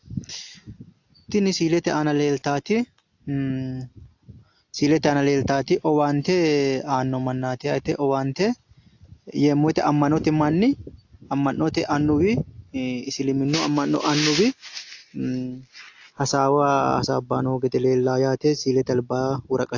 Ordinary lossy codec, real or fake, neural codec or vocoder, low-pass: AAC, 48 kbps; real; none; 7.2 kHz